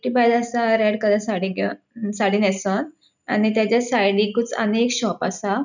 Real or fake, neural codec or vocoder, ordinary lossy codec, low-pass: real; none; none; 7.2 kHz